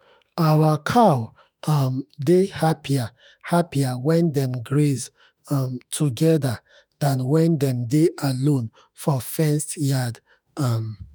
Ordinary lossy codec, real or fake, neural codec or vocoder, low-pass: none; fake; autoencoder, 48 kHz, 32 numbers a frame, DAC-VAE, trained on Japanese speech; none